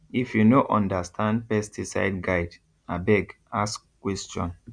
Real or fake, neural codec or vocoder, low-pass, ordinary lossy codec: real; none; 9.9 kHz; none